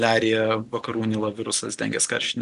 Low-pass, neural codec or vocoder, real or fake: 10.8 kHz; none; real